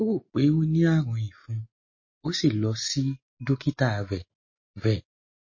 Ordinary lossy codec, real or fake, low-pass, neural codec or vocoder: MP3, 32 kbps; real; 7.2 kHz; none